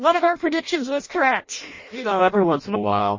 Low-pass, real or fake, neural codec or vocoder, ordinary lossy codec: 7.2 kHz; fake; codec, 16 kHz in and 24 kHz out, 0.6 kbps, FireRedTTS-2 codec; MP3, 32 kbps